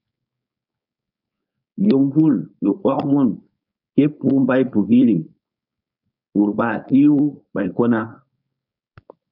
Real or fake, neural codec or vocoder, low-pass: fake; codec, 16 kHz, 4.8 kbps, FACodec; 5.4 kHz